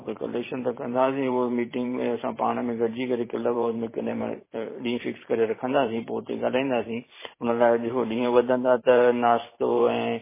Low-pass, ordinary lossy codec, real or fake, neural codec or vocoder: 3.6 kHz; MP3, 16 kbps; fake; vocoder, 44.1 kHz, 128 mel bands every 512 samples, BigVGAN v2